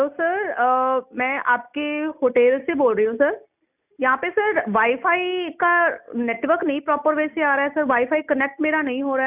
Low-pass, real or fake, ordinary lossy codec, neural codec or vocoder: 3.6 kHz; real; none; none